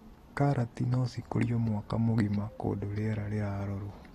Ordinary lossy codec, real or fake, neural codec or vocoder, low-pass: AAC, 32 kbps; real; none; 19.8 kHz